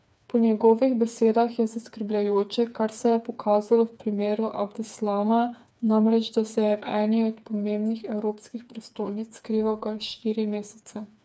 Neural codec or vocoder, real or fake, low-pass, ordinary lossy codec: codec, 16 kHz, 4 kbps, FreqCodec, smaller model; fake; none; none